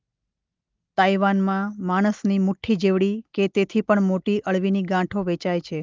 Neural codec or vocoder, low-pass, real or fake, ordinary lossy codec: none; 7.2 kHz; real; Opus, 24 kbps